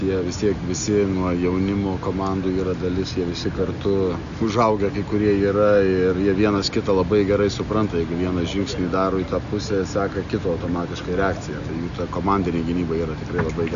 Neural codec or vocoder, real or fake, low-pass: none; real; 7.2 kHz